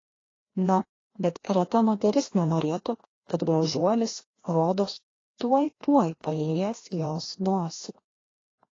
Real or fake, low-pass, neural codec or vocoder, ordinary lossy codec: fake; 7.2 kHz; codec, 16 kHz, 1 kbps, FreqCodec, larger model; AAC, 32 kbps